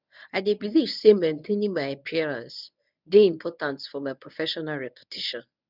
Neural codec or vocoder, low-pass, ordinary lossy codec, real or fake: codec, 24 kHz, 0.9 kbps, WavTokenizer, medium speech release version 1; 5.4 kHz; none; fake